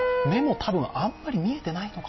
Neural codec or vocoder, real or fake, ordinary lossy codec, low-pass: none; real; MP3, 24 kbps; 7.2 kHz